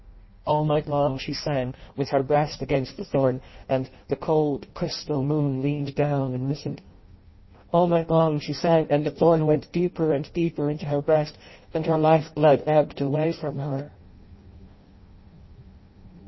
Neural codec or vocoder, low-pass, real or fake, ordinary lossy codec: codec, 16 kHz in and 24 kHz out, 0.6 kbps, FireRedTTS-2 codec; 7.2 kHz; fake; MP3, 24 kbps